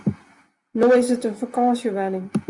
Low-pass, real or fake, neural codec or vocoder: 10.8 kHz; real; none